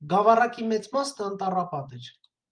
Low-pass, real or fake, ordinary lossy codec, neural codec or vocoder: 9.9 kHz; real; Opus, 32 kbps; none